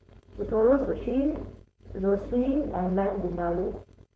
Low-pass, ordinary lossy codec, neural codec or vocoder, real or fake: none; none; codec, 16 kHz, 4.8 kbps, FACodec; fake